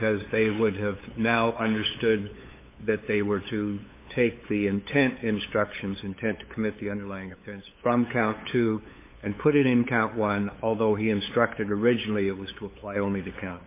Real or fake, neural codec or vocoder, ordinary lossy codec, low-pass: fake; codec, 16 kHz, 8 kbps, FunCodec, trained on LibriTTS, 25 frames a second; MP3, 24 kbps; 3.6 kHz